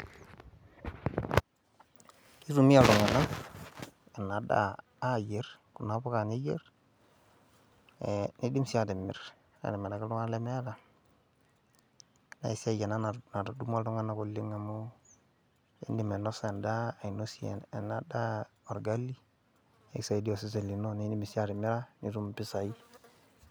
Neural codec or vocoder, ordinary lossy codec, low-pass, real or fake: none; none; none; real